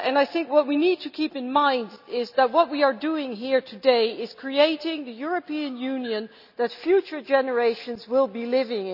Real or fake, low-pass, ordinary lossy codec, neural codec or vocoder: real; 5.4 kHz; none; none